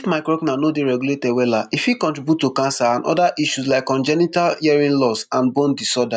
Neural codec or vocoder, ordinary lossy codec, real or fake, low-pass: none; none; real; 9.9 kHz